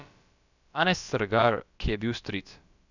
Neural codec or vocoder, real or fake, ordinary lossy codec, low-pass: codec, 16 kHz, about 1 kbps, DyCAST, with the encoder's durations; fake; none; 7.2 kHz